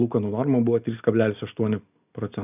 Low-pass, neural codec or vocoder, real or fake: 3.6 kHz; none; real